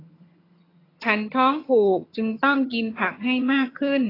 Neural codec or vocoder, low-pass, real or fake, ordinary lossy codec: vocoder, 22.05 kHz, 80 mel bands, HiFi-GAN; 5.4 kHz; fake; AAC, 24 kbps